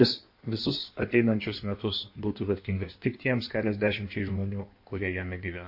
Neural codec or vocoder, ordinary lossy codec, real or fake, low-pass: codec, 16 kHz in and 24 kHz out, 1.1 kbps, FireRedTTS-2 codec; MP3, 32 kbps; fake; 5.4 kHz